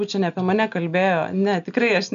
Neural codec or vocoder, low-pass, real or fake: none; 7.2 kHz; real